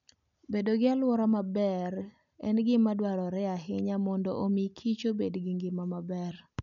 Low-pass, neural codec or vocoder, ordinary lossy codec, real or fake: 7.2 kHz; none; none; real